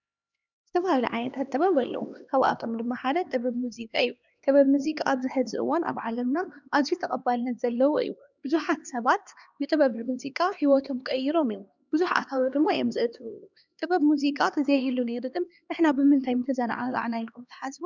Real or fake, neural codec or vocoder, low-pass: fake; codec, 16 kHz, 2 kbps, X-Codec, HuBERT features, trained on LibriSpeech; 7.2 kHz